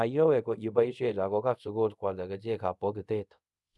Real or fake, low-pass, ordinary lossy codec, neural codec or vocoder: fake; none; none; codec, 24 kHz, 0.5 kbps, DualCodec